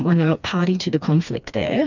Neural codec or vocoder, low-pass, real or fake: codec, 16 kHz, 2 kbps, FreqCodec, smaller model; 7.2 kHz; fake